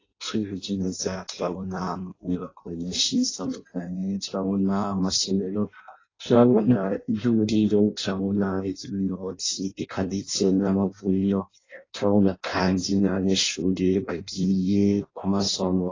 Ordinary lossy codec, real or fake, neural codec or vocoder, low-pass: AAC, 32 kbps; fake; codec, 16 kHz in and 24 kHz out, 0.6 kbps, FireRedTTS-2 codec; 7.2 kHz